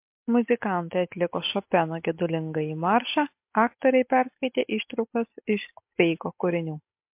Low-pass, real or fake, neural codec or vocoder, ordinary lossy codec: 3.6 kHz; real; none; MP3, 32 kbps